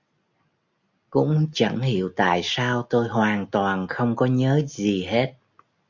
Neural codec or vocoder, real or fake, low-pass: none; real; 7.2 kHz